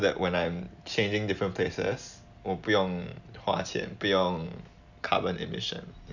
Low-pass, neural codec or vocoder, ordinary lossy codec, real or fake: 7.2 kHz; none; none; real